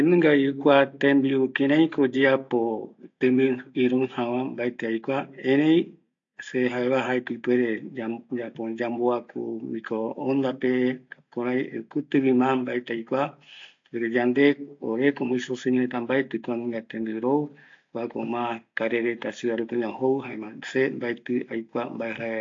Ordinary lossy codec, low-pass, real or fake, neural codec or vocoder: AAC, 48 kbps; 7.2 kHz; real; none